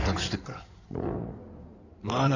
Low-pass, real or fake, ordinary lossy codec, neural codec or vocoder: 7.2 kHz; fake; none; codec, 16 kHz in and 24 kHz out, 2.2 kbps, FireRedTTS-2 codec